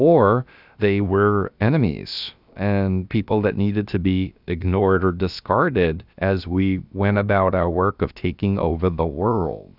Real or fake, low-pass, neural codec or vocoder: fake; 5.4 kHz; codec, 16 kHz, about 1 kbps, DyCAST, with the encoder's durations